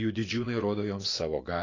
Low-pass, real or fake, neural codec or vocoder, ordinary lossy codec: 7.2 kHz; fake; vocoder, 24 kHz, 100 mel bands, Vocos; AAC, 32 kbps